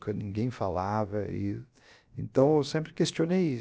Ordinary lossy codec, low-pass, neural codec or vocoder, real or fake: none; none; codec, 16 kHz, about 1 kbps, DyCAST, with the encoder's durations; fake